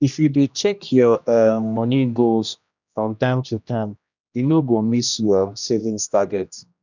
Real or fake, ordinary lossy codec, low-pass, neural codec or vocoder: fake; none; 7.2 kHz; codec, 16 kHz, 1 kbps, X-Codec, HuBERT features, trained on general audio